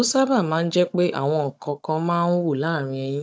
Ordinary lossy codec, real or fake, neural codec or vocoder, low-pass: none; fake; codec, 16 kHz, 16 kbps, FunCodec, trained on Chinese and English, 50 frames a second; none